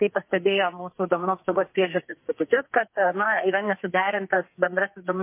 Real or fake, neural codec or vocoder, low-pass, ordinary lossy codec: fake; codec, 44.1 kHz, 2.6 kbps, SNAC; 3.6 kHz; MP3, 24 kbps